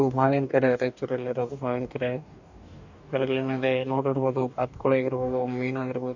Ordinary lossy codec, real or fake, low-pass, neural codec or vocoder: none; fake; 7.2 kHz; codec, 44.1 kHz, 2.6 kbps, DAC